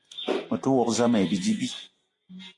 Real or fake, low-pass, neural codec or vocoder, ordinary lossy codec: real; 10.8 kHz; none; AAC, 48 kbps